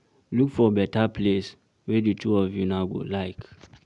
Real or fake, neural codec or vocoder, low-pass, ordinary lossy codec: real; none; 10.8 kHz; none